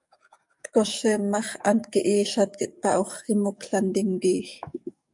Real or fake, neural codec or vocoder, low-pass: fake; codec, 44.1 kHz, 7.8 kbps, DAC; 10.8 kHz